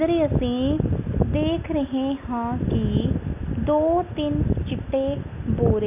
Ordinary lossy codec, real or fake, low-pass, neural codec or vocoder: none; real; 3.6 kHz; none